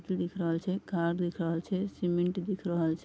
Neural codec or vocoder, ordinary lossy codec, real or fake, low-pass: none; none; real; none